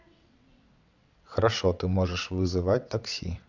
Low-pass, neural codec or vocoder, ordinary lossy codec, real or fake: 7.2 kHz; vocoder, 22.05 kHz, 80 mel bands, WaveNeXt; none; fake